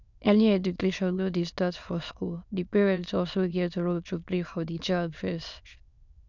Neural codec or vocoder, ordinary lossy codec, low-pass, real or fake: autoencoder, 22.05 kHz, a latent of 192 numbers a frame, VITS, trained on many speakers; none; 7.2 kHz; fake